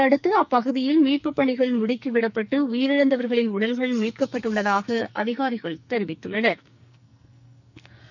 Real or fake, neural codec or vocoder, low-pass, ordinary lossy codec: fake; codec, 44.1 kHz, 2.6 kbps, SNAC; 7.2 kHz; none